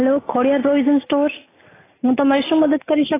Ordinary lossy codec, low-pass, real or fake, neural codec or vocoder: AAC, 16 kbps; 3.6 kHz; real; none